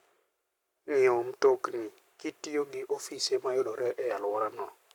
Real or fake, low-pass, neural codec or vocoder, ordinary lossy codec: fake; 19.8 kHz; codec, 44.1 kHz, 7.8 kbps, Pupu-Codec; none